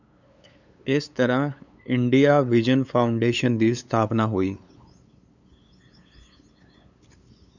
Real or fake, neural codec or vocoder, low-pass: fake; codec, 16 kHz, 8 kbps, FunCodec, trained on LibriTTS, 25 frames a second; 7.2 kHz